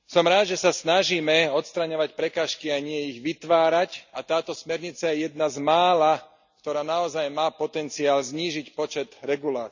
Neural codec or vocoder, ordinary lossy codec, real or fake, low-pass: none; none; real; 7.2 kHz